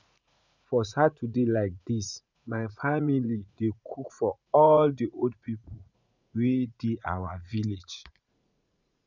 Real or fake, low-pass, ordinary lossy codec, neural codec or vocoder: fake; 7.2 kHz; none; vocoder, 44.1 kHz, 80 mel bands, Vocos